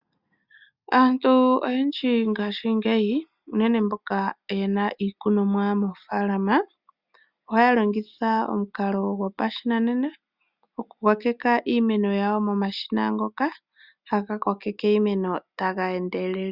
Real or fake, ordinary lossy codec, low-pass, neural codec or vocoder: real; Opus, 64 kbps; 5.4 kHz; none